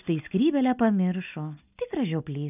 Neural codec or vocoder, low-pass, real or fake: none; 3.6 kHz; real